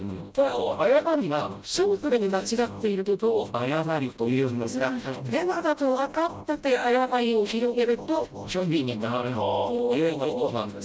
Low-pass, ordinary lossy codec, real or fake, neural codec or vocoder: none; none; fake; codec, 16 kHz, 0.5 kbps, FreqCodec, smaller model